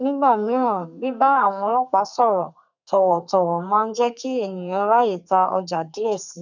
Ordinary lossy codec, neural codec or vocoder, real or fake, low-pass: none; codec, 32 kHz, 1.9 kbps, SNAC; fake; 7.2 kHz